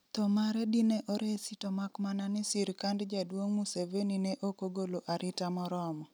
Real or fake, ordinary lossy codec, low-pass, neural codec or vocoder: real; none; none; none